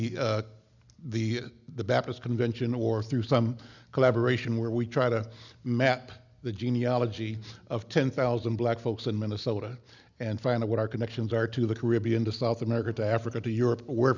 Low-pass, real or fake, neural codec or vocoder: 7.2 kHz; real; none